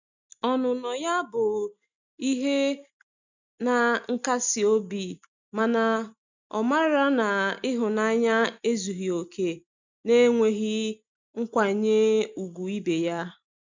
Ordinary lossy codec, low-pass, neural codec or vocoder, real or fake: none; 7.2 kHz; none; real